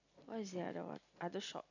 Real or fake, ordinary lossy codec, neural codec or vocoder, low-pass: real; none; none; 7.2 kHz